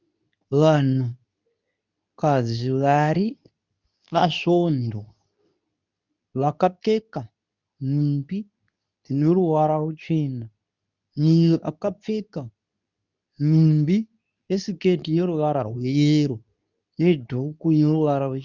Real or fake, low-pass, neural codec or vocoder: fake; 7.2 kHz; codec, 24 kHz, 0.9 kbps, WavTokenizer, medium speech release version 2